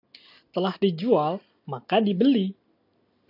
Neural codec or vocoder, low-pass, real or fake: none; 5.4 kHz; real